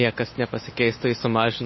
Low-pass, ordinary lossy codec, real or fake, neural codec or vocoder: 7.2 kHz; MP3, 24 kbps; real; none